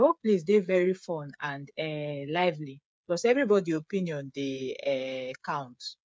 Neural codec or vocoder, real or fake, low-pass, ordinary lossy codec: codec, 16 kHz, 8 kbps, FreqCodec, smaller model; fake; none; none